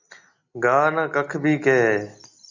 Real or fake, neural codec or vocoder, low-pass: real; none; 7.2 kHz